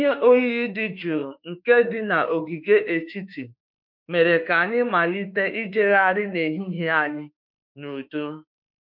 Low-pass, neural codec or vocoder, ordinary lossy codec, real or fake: 5.4 kHz; autoencoder, 48 kHz, 32 numbers a frame, DAC-VAE, trained on Japanese speech; MP3, 48 kbps; fake